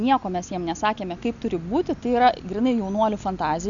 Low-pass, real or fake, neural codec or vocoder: 7.2 kHz; real; none